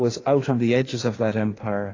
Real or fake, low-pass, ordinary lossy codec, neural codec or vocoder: fake; 7.2 kHz; AAC, 32 kbps; codec, 16 kHz in and 24 kHz out, 1.1 kbps, FireRedTTS-2 codec